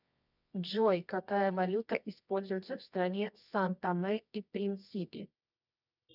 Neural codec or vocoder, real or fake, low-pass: codec, 24 kHz, 0.9 kbps, WavTokenizer, medium music audio release; fake; 5.4 kHz